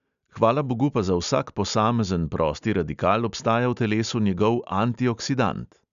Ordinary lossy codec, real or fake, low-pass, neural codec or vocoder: none; real; 7.2 kHz; none